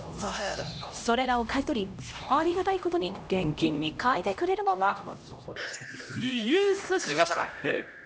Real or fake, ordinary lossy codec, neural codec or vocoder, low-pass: fake; none; codec, 16 kHz, 1 kbps, X-Codec, HuBERT features, trained on LibriSpeech; none